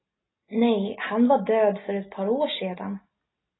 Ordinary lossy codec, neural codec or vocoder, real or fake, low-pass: AAC, 16 kbps; none; real; 7.2 kHz